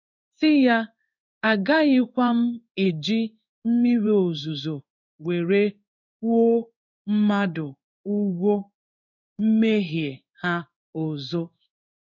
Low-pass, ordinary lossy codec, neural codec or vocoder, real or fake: 7.2 kHz; none; codec, 16 kHz in and 24 kHz out, 1 kbps, XY-Tokenizer; fake